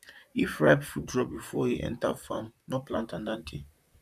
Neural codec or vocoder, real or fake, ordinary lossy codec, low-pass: vocoder, 44.1 kHz, 128 mel bands, Pupu-Vocoder; fake; none; 14.4 kHz